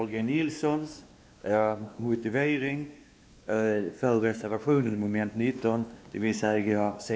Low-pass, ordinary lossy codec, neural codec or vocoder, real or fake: none; none; codec, 16 kHz, 2 kbps, X-Codec, WavLM features, trained on Multilingual LibriSpeech; fake